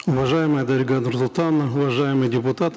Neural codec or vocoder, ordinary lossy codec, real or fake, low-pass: none; none; real; none